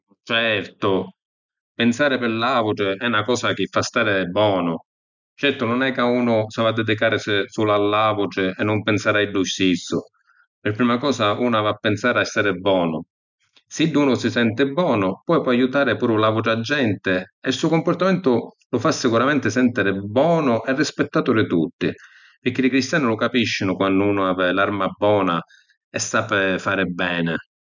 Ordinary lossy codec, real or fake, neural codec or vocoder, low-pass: none; real; none; 7.2 kHz